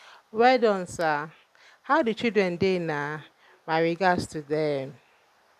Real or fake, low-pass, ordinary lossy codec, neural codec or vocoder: real; 14.4 kHz; none; none